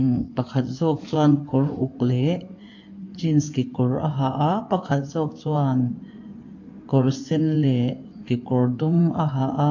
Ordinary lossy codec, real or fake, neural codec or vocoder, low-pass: AAC, 48 kbps; fake; codec, 16 kHz in and 24 kHz out, 2.2 kbps, FireRedTTS-2 codec; 7.2 kHz